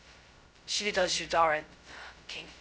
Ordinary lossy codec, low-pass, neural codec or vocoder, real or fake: none; none; codec, 16 kHz, 0.2 kbps, FocalCodec; fake